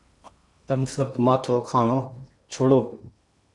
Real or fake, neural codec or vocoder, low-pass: fake; codec, 16 kHz in and 24 kHz out, 0.8 kbps, FocalCodec, streaming, 65536 codes; 10.8 kHz